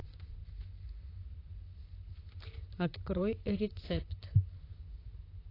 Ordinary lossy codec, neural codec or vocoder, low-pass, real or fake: AAC, 32 kbps; vocoder, 44.1 kHz, 128 mel bands, Pupu-Vocoder; 5.4 kHz; fake